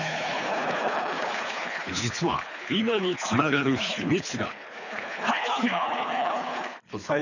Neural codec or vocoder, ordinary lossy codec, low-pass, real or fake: codec, 24 kHz, 3 kbps, HILCodec; none; 7.2 kHz; fake